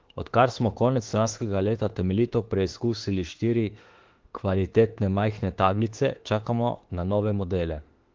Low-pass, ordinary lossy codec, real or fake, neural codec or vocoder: 7.2 kHz; Opus, 16 kbps; fake; autoencoder, 48 kHz, 32 numbers a frame, DAC-VAE, trained on Japanese speech